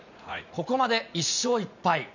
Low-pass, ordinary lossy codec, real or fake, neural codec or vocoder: 7.2 kHz; none; fake; vocoder, 44.1 kHz, 80 mel bands, Vocos